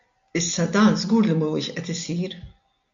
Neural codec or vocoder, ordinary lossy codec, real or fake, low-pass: none; AAC, 64 kbps; real; 7.2 kHz